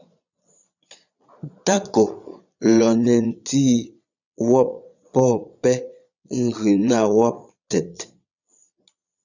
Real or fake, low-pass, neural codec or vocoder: fake; 7.2 kHz; vocoder, 22.05 kHz, 80 mel bands, Vocos